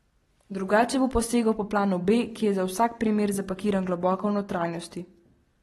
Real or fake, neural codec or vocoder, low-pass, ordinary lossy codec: real; none; 19.8 kHz; AAC, 32 kbps